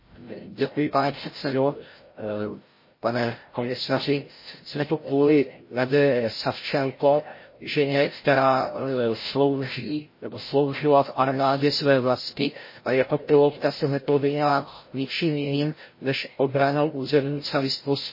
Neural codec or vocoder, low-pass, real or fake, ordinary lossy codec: codec, 16 kHz, 0.5 kbps, FreqCodec, larger model; 5.4 kHz; fake; MP3, 24 kbps